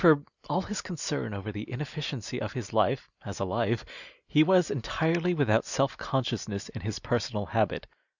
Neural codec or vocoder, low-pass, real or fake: none; 7.2 kHz; real